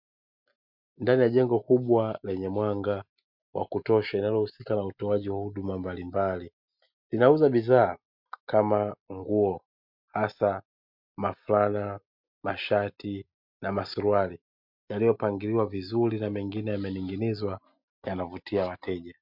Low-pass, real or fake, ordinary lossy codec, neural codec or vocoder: 5.4 kHz; real; MP3, 48 kbps; none